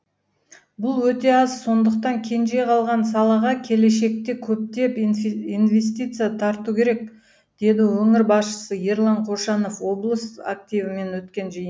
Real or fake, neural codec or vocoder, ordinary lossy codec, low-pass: real; none; none; none